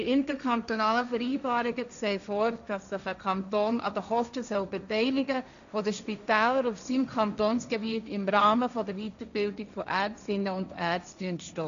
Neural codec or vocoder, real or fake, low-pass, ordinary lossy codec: codec, 16 kHz, 1.1 kbps, Voila-Tokenizer; fake; 7.2 kHz; none